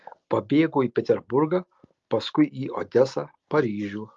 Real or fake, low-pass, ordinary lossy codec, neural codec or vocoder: real; 7.2 kHz; Opus, 32 kbps; none